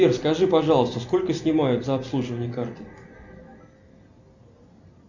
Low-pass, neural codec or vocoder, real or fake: 7.2 kHz; none; real